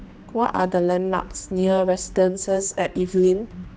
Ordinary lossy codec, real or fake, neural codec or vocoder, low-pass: none; fake; codec, 16 kHz, 2 kbps, X-Codec, HuBERT features, trained on general audio; none